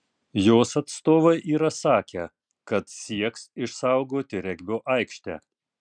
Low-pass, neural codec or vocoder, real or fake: 9.9 kHz; none; real